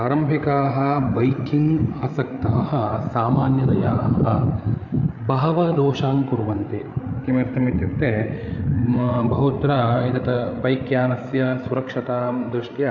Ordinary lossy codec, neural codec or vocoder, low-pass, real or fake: none; codec, 16 kHz, 8 kbps, FreqCodec, larger model; 7.2 kHz; fake